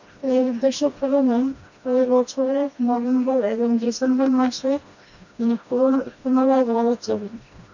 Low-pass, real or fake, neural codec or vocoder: 7.2 kHz; fake; codec, 16 kHz, 1 kbps, FreqCodec, smaller model